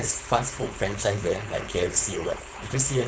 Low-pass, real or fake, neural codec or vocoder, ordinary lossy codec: none; fake; codec, 16 kHz, 4.8 kbps, FACodec; none